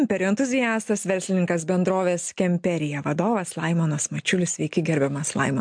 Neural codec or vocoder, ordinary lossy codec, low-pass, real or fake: none; MP3, 64 kbps; 9.9 kHz; real